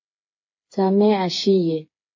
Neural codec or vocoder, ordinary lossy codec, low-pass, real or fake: codec, 16 kHz, 4 kbps, FreqCodec, smaller model; MP3, 32 kbps; 7.2 kHz; fake